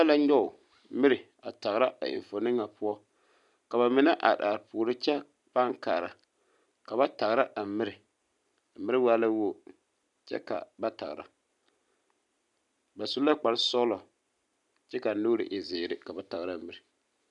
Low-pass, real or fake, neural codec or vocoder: 10.8 kHz; real; none